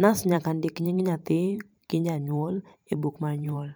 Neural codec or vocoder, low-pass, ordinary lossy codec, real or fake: vocoder, 44.1 kHz, 128 mel bands every 512 samples, BigVGAN v2; none; none; fake